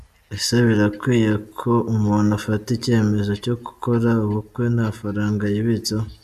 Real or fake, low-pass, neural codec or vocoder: real; 14.4 kHz; none